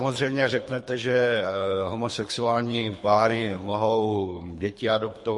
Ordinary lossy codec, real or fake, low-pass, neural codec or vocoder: MP3, 48 kbps; fake; 10.8 kHz; codec, 24 kHz, 3 kbps, HILCodec